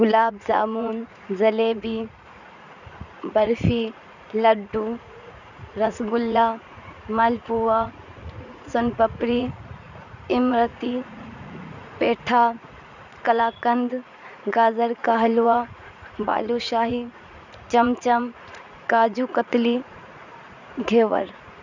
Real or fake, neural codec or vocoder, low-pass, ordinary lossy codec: fake; vocoder, 44.1 kHz, 80 mel bands, Vocos; 7.2 kHz; none